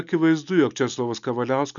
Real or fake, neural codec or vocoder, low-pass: real; none; 7.2 kHz